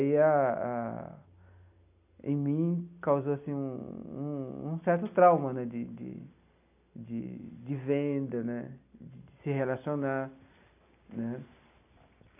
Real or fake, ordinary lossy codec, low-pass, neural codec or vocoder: real; none; 3.6 kHz; none